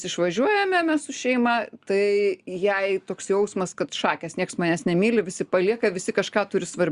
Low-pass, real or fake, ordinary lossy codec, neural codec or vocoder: 10.8 kHz; real; Opus, 64 kbps; none